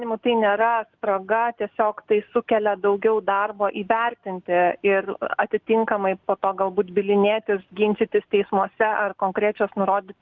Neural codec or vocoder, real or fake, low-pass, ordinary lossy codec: none; real; 7.2 kHz; Opus, 24 kbps